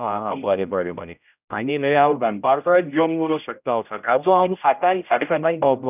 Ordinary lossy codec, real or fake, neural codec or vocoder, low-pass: none; fake; codec, 16 kHz, 0.5 kbps, X-Codec, HuBERT features, trained on general audio; 3.6 kHz